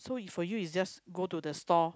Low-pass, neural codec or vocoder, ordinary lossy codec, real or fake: none; none; none; real